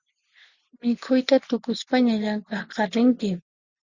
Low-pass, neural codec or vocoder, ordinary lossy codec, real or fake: 7.2 kHz; none; Opus, 64 kbps; real